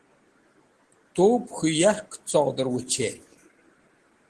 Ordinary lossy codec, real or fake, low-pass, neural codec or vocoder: Opus, 16 kbps; real; 10.8 kHz; none